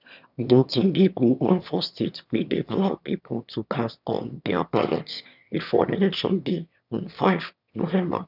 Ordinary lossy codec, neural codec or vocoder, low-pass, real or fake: none; autoencoder, 22.05 kHz, a latent of 192 numbers a frame, VITS, trained on one speaker; 5.4 kHz; fake